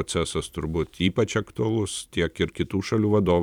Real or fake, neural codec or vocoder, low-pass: fake; vocoder, 44.1 kHz, 128 mel bands every 512 samples, BigVGAN v2; 19.8 kHz